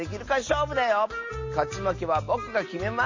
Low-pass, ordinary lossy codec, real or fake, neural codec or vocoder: 7.2 kHz; MP3, 32 kbps; real; none